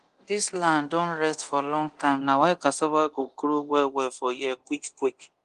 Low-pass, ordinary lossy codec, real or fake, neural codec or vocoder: 10.8 kHz; Opus, 24 kbps; fake; codec, 24 kHz, 0.9 kbps, DualCodec